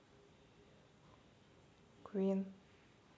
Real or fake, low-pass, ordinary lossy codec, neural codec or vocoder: real; none; none; none